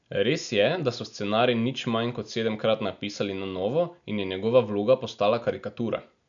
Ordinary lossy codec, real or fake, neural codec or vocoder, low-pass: none; real; none; 7.2 kHz